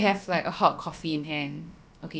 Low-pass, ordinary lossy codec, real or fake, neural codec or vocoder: none; none; fake; codec, 16 kHz, about 1 kbps, DyCAST, with the encoder's durations